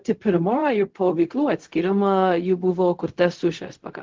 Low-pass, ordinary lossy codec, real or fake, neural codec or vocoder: 7.2 kHz; Opus, 16 kbps; fake; codec, 16 kHz, 0.4 kbps, LongCat-Audio-Codec